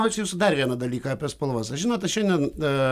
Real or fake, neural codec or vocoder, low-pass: real; none; 14.4 kHz